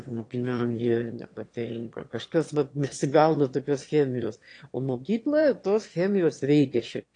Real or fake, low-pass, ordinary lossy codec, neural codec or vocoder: fake; 9.9 kHz; AAC, 48 kbps; autoencoder, 22.05 kHz, a latent of 192 numbers a frame, VITS, trained on one speaker